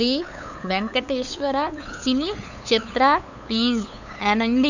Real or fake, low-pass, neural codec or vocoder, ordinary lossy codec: fake; 7.2 kHz; codec, 16 kHz, 8 kbps, FunCodec, trained on LibriTTS, 25 frames a second; none